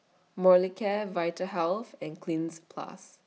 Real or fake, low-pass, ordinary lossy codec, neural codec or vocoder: real; none; none; none